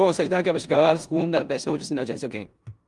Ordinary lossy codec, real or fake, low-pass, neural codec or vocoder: Opus, 32 kbps; fake; 10.8 kHz; codec, 16 kHz in and 24 kHz out, 0.9 kbps, LongCat-Audio-Codec, four codebook decoder